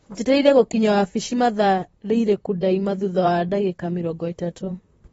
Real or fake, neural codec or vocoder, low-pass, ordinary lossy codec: fake; vocoder, 44.1 kHz, 128 mel bands, Pupu-Vocoder; 19.8 kHz; AAC, 24 kbps